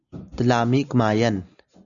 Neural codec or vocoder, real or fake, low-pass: none; real; 7.2 kHz